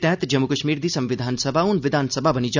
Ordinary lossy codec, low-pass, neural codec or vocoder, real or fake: none; 7.2 kHz; none; real